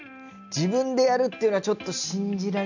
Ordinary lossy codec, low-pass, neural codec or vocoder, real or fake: none; 7.2 kHz; none; real